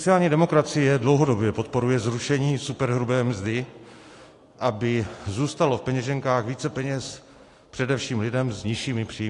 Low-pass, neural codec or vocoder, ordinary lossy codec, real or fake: 10.8 kHz; none; AAC, 48 kbps; real